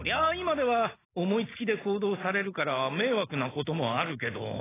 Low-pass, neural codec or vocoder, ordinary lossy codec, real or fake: 3.6 kHz; none; AAC, 16 kbps; real